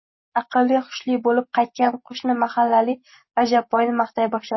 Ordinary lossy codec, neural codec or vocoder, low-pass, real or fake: MP3, 24 kbps; none; 7.2 kHz; real